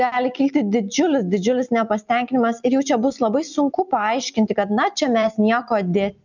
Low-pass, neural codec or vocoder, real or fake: 7.2 kHz; none; real